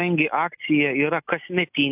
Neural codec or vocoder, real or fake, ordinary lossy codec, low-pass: none; real; AAC, 32 kbps; 3.6 kHz